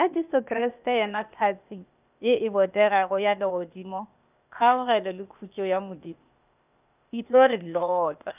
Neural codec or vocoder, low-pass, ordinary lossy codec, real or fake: codec, 16 kHz, 0.8 kbps, ZipCodec; 3.6 kHz; none; fake